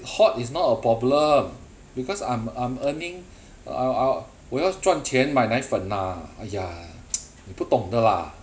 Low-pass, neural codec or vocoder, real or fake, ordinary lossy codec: none; none; real; none